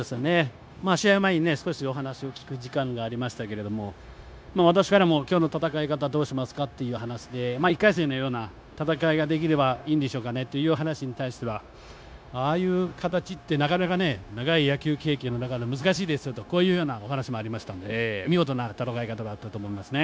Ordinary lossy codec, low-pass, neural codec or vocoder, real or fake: none; none; codec, 16 kHz, 0.9 kbps, LongCat-Audio-Codec; fake